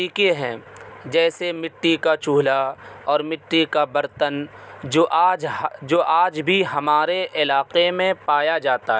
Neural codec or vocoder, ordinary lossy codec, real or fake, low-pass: none; none; real; none